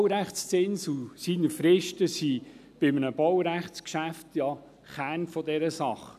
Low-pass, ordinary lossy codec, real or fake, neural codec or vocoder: 14.4 kHz; none; real; none